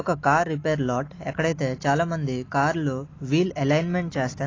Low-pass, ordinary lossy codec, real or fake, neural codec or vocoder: 7.2 kHz; AAC, 32 kbps; real; none